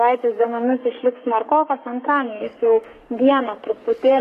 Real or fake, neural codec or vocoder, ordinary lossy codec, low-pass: fake; codec, 44.1 kHz, 3.4 kbps, Pupu-Codec; AAC, 48 kbps; 14.4 kHz